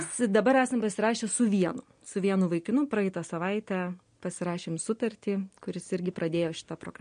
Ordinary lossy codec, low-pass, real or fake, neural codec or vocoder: MP3, 48 kbps; 9.9 kHz; real; none